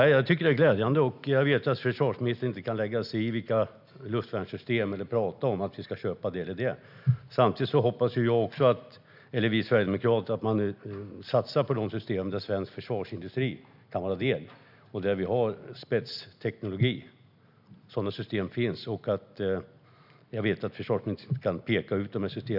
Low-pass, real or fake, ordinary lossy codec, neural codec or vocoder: 5.4 kHz; real; none; none